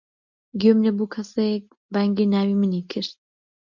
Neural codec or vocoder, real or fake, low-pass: none; real; 7.2 kHz